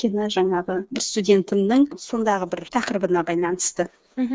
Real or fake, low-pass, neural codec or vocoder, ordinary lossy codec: fake; none; codec, 16 kHz, 4 kbps, FreqCodec, smaller model; none